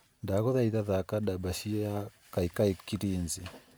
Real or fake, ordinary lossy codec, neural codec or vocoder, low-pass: fake; none; vocoder, 44.1 kHz, 128 mel bands every 512 samples, BigVGAN v2; none